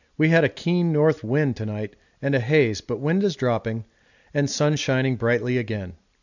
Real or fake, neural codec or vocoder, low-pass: real; none; 7.2 kHz